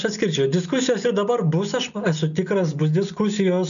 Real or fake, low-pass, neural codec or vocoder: real; 7.2 kHz; none